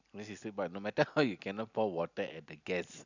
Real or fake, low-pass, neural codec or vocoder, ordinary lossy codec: real; 7.2 kHz; none; none